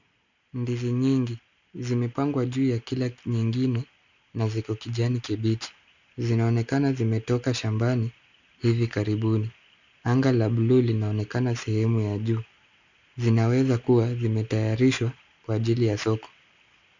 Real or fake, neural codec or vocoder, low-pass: real; none; 7.2 kHz